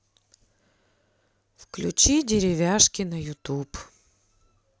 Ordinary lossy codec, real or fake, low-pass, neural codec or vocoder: none; real; none; none